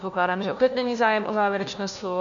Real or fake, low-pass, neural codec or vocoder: fake; 7.2 kHz; codec, 16 kHz, 1 kbps, FunCodec, trained on LibriTTS, 50 frames a second